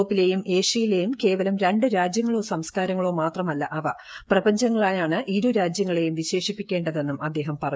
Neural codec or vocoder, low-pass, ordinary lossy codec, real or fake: codec, 16 kHz, 8 kbps, FreqCodec, smaller model; none; none; fake